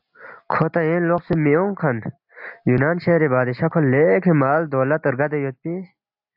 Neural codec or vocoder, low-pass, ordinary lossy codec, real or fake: none; 5.4 kHz; AAC, 48 kbps; real